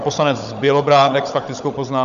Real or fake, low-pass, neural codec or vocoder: fake; 7.2 kHz; codec, 16 kHz, 16 kbps, FunCodec, trained on Chinese and English, 50 frames a second